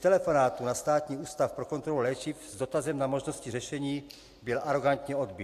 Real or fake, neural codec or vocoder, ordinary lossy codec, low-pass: real; none; AAC, 64 kbps; 14.4 kHz